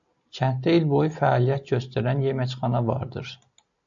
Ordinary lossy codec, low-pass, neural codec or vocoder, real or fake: AAC, 64 kbps; 7.2 kHz; none; real